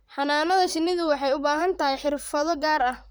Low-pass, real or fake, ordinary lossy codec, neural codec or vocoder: none; fake; none; vocoder, 44.1 kHz, 128 mel bands, Pupu-Vocoder